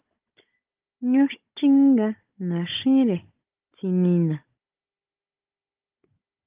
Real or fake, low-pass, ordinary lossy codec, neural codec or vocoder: fake; 3.6 kHz; Opus, 24 kbps; codec, 16 kHz, 16 kbps, FunCodec, trained on Chinese and English, 50 frames a second